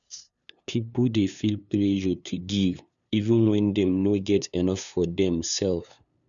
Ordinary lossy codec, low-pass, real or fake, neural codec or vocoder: none; 7.2 kHz; fake; codec, 16 kHz, 2 kbps, FunCodec, trained on LibriTTS, 25 frames a second